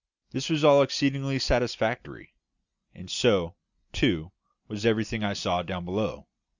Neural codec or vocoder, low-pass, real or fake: none; 7.2 kHz; real